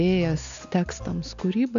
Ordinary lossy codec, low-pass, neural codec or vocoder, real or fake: MP3, 64 kbps; 7.2 kHz; none; real